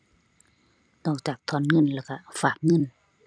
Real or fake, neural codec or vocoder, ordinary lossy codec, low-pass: fake; vocoder, 44.1 kHz, 128 mel bands every 256 samples, BigVGAN v2; none; 9.9 kHz